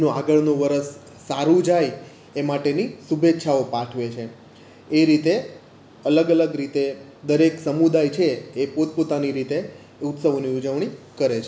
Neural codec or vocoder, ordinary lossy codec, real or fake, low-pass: none; none; real; none